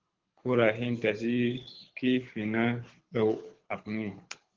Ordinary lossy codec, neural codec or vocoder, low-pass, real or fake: Opus, 16 kbps; codec, 24 kHz, 6 kbps, HILCodec; 7.2 kHz; fake